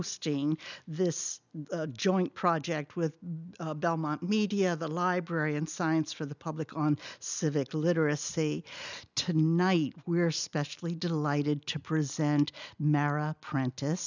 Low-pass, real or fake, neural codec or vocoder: 7.2 kHz; real; none